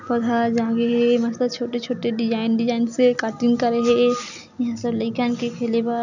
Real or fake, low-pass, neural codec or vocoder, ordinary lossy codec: real; 7.2 kHz; none; none